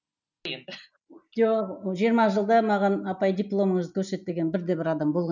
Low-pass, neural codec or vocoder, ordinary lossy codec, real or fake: 7.2 kHz; none; none; real